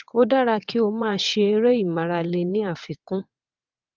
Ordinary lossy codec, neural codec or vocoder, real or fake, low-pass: Opus, 24 kbps; vocoder, 22.05 kHz, 80 mel bands, WaveNeXt; fake; 7.2 kHz